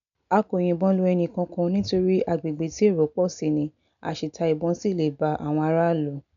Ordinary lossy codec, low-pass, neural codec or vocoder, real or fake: MP3, 96 kbps; 7.2 kHz; none; real